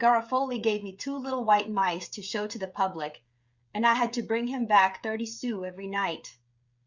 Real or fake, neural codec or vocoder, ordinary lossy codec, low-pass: fake; codec, 16 kHz, 16 kbps, FreqCodec, smaller model; Opus, 64 kbps; 7.2 kHz